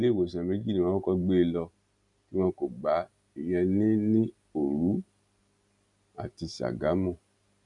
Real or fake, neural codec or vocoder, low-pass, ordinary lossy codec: real; none; 10.8 kHz; none